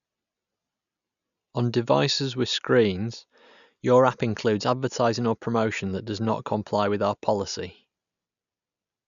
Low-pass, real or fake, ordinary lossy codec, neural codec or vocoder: 7.2 kHz; real; none; none